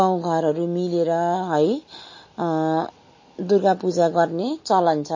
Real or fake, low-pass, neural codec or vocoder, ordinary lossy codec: real; 7.2 kHz; none; MP3, 32 kbps